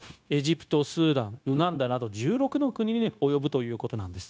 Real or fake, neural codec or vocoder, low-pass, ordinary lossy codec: fake; codec, 16 kHz, 0.9 kbps, LongCat-Audio-Codec; none; none